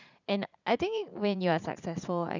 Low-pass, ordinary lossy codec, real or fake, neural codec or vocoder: 7.2 kHz; none; fake; vocoder, 44.1 kHz, 80 mel bands, Vocos